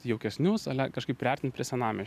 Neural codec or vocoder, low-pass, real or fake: none; 14.4 kHz; real